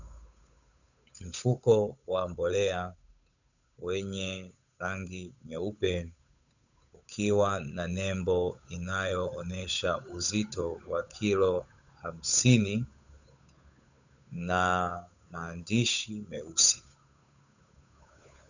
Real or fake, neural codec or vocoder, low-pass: fake; codec, 16 kHz, 8 kbps, FunCodec, trained on Chinese and English, 25 frames a second; 7.2 kHz